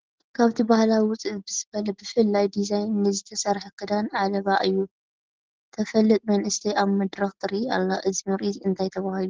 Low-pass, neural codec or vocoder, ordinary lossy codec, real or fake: 7.2 kHz; none; Opus, 16 kbps; real